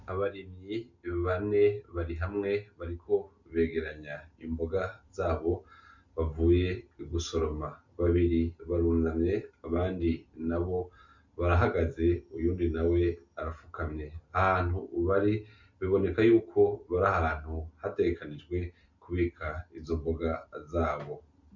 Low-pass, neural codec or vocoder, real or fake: 7.2 kHz; none; real